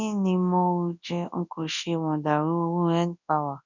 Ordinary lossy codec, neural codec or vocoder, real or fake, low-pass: none; codec, 24 kHz, 0.9 kbps, WavTokenizer, large speech release; fake; 7.2 kHz